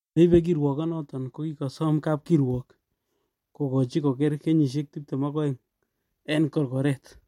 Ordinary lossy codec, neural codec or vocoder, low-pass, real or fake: MP3, 64 kbps; none; 19.8 kHz; real